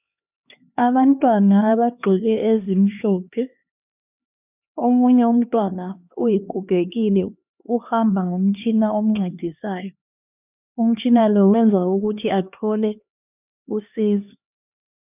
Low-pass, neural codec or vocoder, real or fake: 3.6 kHz; codec, 16 kHz, 2 kbps, X-Codec, HuBERT features, trained on LibriSpeech; fake